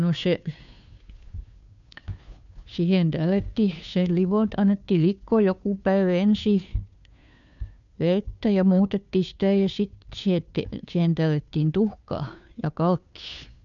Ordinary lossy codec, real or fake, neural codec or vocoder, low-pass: none; fake; codec, 16 kHz, 2 kbps, FunCodec, trained on Chinese and English, 25 frames a second; 7.2 kHz